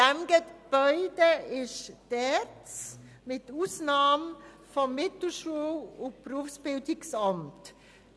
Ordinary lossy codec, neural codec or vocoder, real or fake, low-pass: none; none; real; none